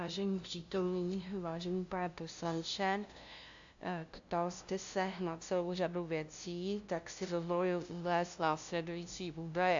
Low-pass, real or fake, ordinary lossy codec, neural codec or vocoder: 7.2 kHz; fake; AAC, 64 kbps; codec, 16 kHz, 0.5 kbps, FunCodec, trained on LibriTTS, 25 frames a second